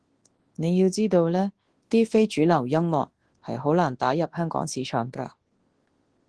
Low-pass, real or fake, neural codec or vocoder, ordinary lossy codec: 10.8 kHz; fake; codec, 24 kHz, 0.9 kbps, WavTokenizer, large speech release; Opus, 16 kbps